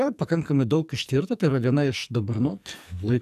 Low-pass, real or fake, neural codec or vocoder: 14.4 kHz; fake; codec, 44.1 kHz, 2.6 kbps, SNAC